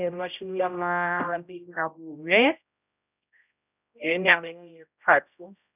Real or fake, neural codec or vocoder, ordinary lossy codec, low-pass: fake; codec, 16 kHz, 0.5 kbps, X-Codec, HuBERT features, trained on general audio; none; 3.6 kHz